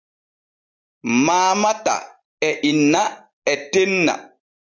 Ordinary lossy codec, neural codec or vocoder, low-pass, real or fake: AAC, 48 kbps; none; 7.2 kHz; real